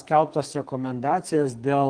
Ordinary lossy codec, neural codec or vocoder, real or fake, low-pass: Opus, 24 kbps; codec, 44.1 kHz, 2.6 kbps, SNAC; fake; 9.9 kHz